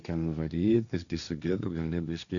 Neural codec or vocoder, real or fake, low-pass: codec, 16 kHz, 1.1 kbps, Voila-Tokenizer; fake; 7.2 kHz